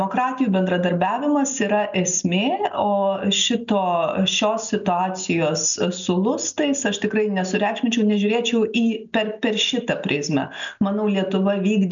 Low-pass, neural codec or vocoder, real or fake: 7.2 kHz; none; real